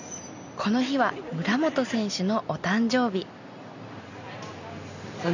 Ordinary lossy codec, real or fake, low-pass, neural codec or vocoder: none; real; 7.2 kHz; none